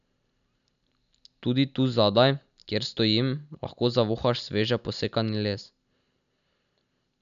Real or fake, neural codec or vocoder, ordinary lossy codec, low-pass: real; none; none; 7.2 kHz